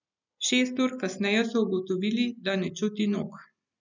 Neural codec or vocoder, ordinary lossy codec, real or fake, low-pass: none; none; real; 7.2 kHz